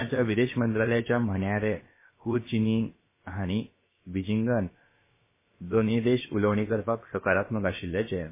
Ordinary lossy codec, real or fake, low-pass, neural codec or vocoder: MP3, 16 kbps; fake; 3.6 kHz; codec, 16 kHz, about 1 kbps, DyCAST, with the encoder's durations